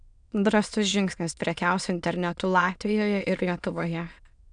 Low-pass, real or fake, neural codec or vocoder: 9.9 kHz; fake; autoencoder, 22.05 kHz, a latent of 192 numbers a frame, VITS, trained on many speakers